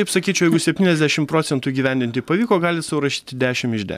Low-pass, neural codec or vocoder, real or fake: 14.4 kHz; none; real